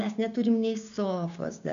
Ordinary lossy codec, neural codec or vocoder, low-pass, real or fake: MP3, 64 kbps; codec, 16 kHz, 6 kbps, DAC; 7.2 kHz; fake